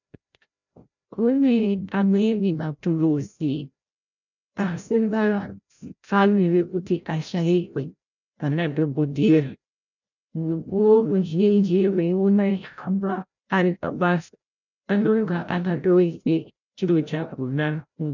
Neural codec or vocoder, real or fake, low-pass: codec, 16 kHz, 0.5 kbps, FreqCodec, larger model; fake; 7.2 kHz